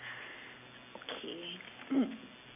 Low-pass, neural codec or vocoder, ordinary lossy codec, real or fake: 3.6 kHz; none; none; real